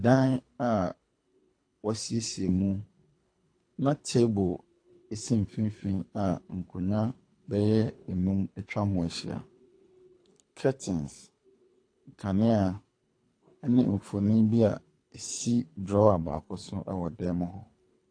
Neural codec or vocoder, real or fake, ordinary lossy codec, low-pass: codec, 24 kHz, 3 kbps, HILCodec; fake; AAC, 48 kbps; 9.9 kHz